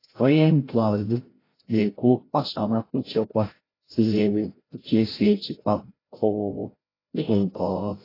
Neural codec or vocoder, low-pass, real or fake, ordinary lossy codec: codec, 16 kHz, 0.5 kbps, FreqCodec, larger model; 5.4 kHz; fake; AAC, 24 kbps